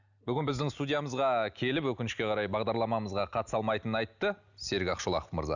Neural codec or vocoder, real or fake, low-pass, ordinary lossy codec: none; real; 7.2 kHz; none